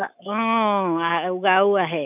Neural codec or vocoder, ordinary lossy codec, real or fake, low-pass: autoencoder, 48 kHz, 128 numbers a frame, DAC-VAE, trained on Japanese speech; none; fake; 3.6 kHz